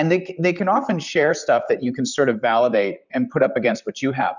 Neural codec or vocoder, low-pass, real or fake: vocoder, 44.1 kHz, 128 mel bands, Pupu-Vocoder; 7.2 kHz; fake